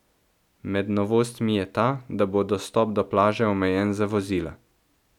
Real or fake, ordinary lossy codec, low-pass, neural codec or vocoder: real; none; 19.8 kHz; none